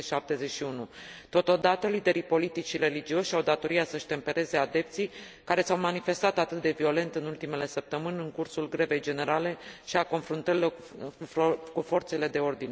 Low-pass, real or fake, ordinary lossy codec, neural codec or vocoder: none; real; none; none